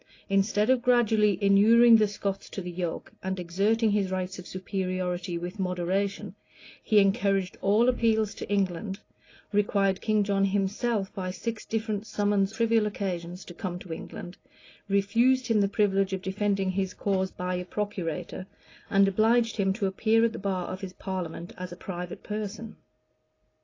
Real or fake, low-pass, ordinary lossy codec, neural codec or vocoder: real; 7.2 kHz; AAC, 32 kbps; none